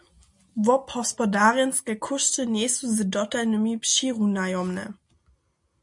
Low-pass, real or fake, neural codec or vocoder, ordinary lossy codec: 10.8 kHz; real; none; MP3, 64 kbps